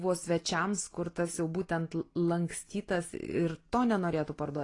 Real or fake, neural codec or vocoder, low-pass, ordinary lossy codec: real; none; 10.8 kHz; AAC, 32 kbps